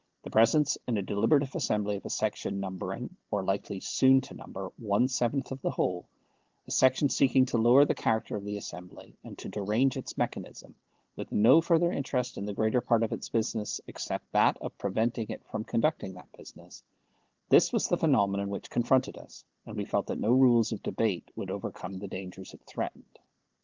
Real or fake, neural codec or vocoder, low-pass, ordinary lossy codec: real; none; 7.2 kHz; Opus, 16 kbps